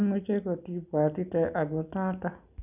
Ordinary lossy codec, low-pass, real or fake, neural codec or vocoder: none; 3.6 kHz; real; none